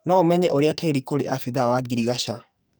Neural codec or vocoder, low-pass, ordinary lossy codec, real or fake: codec, 44.1 kHz, 2.6 kbps, SNAC; none; none; fake